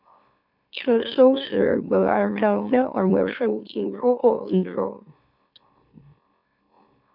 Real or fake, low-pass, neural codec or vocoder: fake; 5.4 kHz; autoencoder, 44.1 kHz, a latent of 192 numbers a frame, MeloTTS